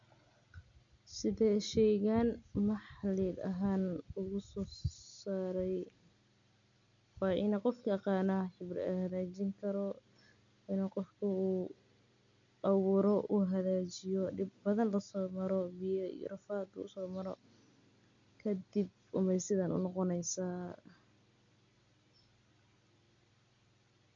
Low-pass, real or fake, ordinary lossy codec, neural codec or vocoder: 7.2 kHz; real; none; none